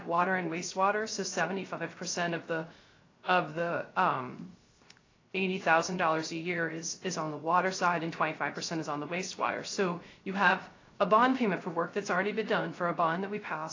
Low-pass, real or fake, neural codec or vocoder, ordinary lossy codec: 7.2 kHz; fake; codec, 16 kHz, 0.3 kbps, FocalCodec; AAC, 32 kbps